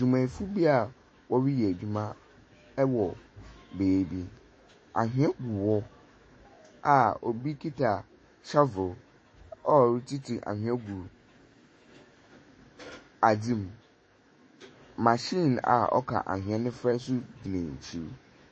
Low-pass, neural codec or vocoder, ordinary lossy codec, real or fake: 9.9 kHz; autoencoder, 48 kHz, 128 numbers a frame, DAC-VAE, trained on Japanese speech; MP3, 32 kbps; fake